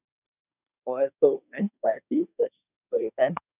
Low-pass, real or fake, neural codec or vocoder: 3.6 kHz; fake; codec, 24 kHz, 1 kbps, SNAC